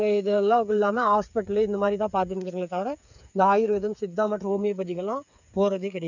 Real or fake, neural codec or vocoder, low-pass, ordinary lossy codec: fake; codec, 16 kHz, 8 kbps, FreqCodec, smaller model; 7.2 kHz; none